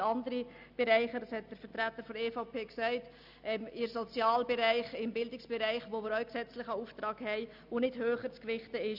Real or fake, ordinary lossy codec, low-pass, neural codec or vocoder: real; none; 5.4 kHz; none